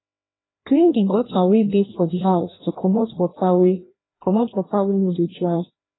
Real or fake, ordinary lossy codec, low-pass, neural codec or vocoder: fake; AAC, 16 kbps; 7.2 kHz; codec, 16 kHz, 1 kbps, FreqCodec, larger model